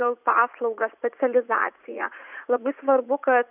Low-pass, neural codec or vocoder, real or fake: 3.6 kHz; vocoder, 44.1 kHz, 80 mel bands, Vocos; fake